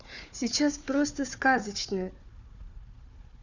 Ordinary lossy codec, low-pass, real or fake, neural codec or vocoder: none; 7.2 kHz; fake; codec, 16 kHz, 4 kbps, FunCodec, trained on Chinese and English, 50 frames a second